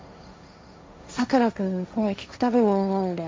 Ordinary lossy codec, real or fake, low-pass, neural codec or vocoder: none; fake; none; codec, 16 kHz, 1.1 kbps, Voila-Tokenizer